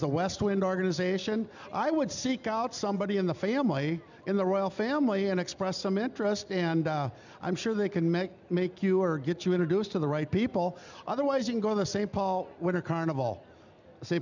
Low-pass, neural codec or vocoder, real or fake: 7.2 kHz; none; real